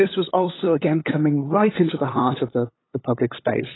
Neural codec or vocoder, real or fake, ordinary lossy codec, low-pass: codec, 16 kHz, 8 kbps, FunCodec, trained on LibriTTS, 25 frames a second; fake; AAC, 16 kbps; 7.2 kHz